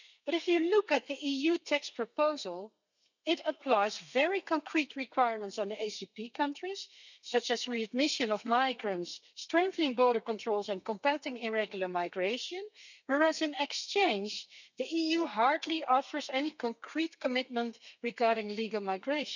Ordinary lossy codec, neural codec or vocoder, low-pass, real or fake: none; codec, 32 kHz, 1.9 kbps, SNAC; 7.2 kHz; fake